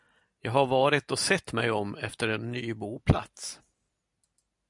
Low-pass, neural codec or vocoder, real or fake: 10.8 kHz; none; real